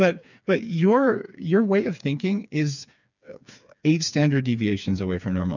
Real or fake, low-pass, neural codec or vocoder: fake; 7.2 kHz; codec, 16 kHz, 4 kbps, FreqCodec, smaller model